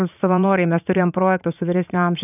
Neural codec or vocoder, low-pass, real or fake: vocoder, 44.1 kHz, 80 mel bands, Vocos; 3.6 kHz; fake